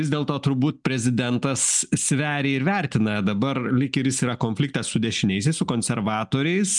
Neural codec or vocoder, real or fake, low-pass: none; real; 10.8 kHz